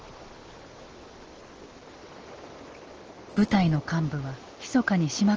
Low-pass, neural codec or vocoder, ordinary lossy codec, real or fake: 7.2 kHz; none; Opus, 16 kbps; real